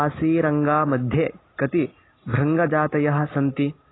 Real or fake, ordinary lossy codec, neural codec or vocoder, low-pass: real; AAC, 16 kbps; none; 7.2 kHz